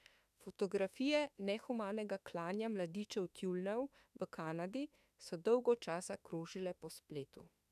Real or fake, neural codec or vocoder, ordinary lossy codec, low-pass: fake; autoencoder, 48 kHz, 32 numbers a frame, DAC-VAE, trained on Japanese speech; none; 14.4 kHz